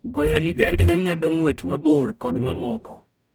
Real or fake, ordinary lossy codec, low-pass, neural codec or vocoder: fake; none; none; codec, 44.1 kHz, 0.9 kbps, DAC